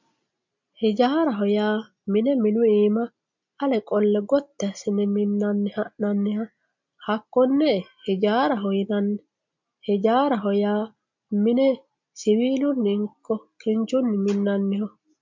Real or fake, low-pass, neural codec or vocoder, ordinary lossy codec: real; 7.2 kHz; none; MP3, 48 kbps